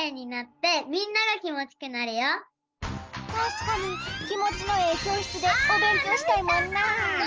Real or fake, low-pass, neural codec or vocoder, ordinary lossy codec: real; 7.2 kHz; none; Opus, 24 kbps